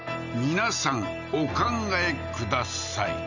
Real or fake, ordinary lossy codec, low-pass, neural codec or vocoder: real; none; 7.2 kHz; none